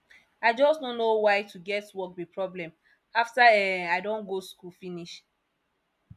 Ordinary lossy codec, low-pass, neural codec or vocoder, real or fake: none; 14.4 kHz; none; real